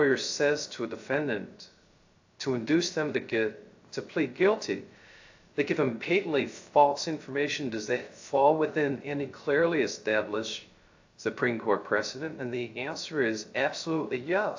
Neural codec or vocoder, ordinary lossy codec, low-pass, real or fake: codec, 16 kHz, 0.3 kbps, FocalCodec; AAC, 48 kbps; 7.2 kHz; fake